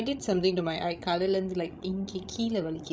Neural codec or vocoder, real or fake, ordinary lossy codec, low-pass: codec, 16 kHz, 16 kbps, FreqCodec, larger model; fake; none; none